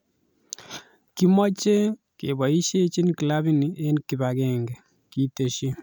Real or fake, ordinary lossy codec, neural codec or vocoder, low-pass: real; none; none; none